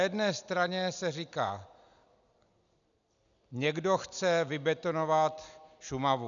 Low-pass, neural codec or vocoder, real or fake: 7.2 kHz; none; real